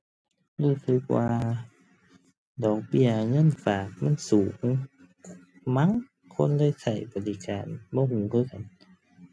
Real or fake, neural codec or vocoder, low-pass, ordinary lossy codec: real; none; none; none